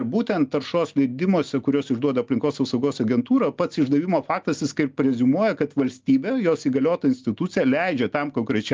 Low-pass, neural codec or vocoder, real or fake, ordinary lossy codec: 7.2 kHz; none; real; Opus, 32 kbps